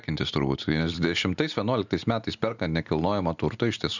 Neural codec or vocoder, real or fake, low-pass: none; real; 7.2 kHz